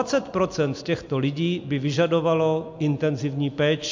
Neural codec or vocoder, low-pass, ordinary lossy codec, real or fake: none; 7.2 kHz; MP3, 48 kbps; real